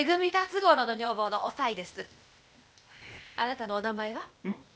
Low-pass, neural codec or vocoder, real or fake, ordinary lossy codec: none; codec, 16 kHz, 0.8 kbps, ZipCodec; fake; none